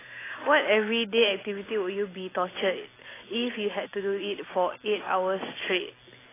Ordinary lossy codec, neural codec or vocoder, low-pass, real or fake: AAC, 16 kbps; none; 3.6 kHz; real